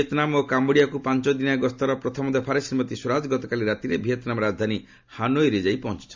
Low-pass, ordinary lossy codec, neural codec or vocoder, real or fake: 7.2 kHz; MP3, 64 kbps; none; real